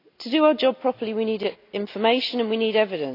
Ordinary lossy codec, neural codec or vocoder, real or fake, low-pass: AAC, 32 kbps; none; real; 5.4 kHz